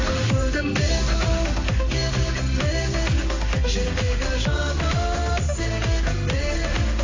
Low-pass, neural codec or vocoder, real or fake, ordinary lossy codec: 7.2 kHz; codec, 16 kHz in and 24 kHz out, 1 kbps, XY-Tokenizer; fake; AAC, 32 kbps